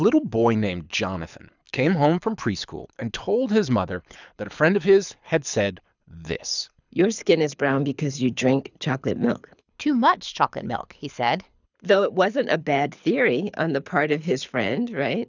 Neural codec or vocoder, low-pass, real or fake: codec, 24 kHz, 6 kbps, HILCodec; 7.2 kHz; fake